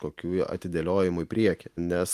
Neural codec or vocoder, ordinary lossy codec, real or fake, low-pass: none; Opus, 32 kbps; real; 14.4 kHz